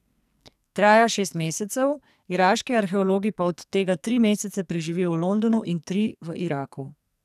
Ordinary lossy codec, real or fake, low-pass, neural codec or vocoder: none; fake; 14.4 kHz; codec, 44.1 kHz, 2.6 kbps, SNAC